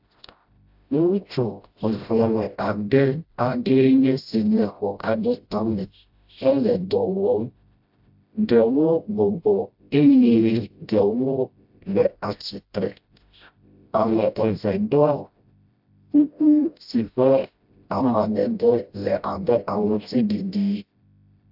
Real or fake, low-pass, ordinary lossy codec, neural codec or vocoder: fake; 5.4 kHz; AAC, 48 kbps; codec, 16 kHz, 0.5 kbps, FreqCodec, smaller model